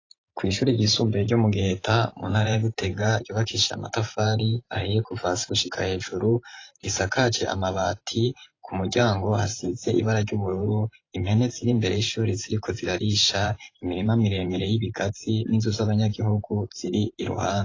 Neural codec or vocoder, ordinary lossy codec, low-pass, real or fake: vocoder, 44.1 kHz, 128 mel bands every 512 samples, BigVGAN v2; AAC, 32 kbps; 7.2 kHz; fake